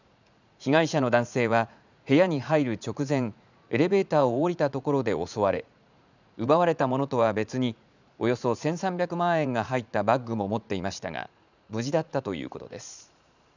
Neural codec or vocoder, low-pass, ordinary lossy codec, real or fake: vocoder, 44.1 kHz, 80 mel bands, Vocos; 7.2 kHz; none; fake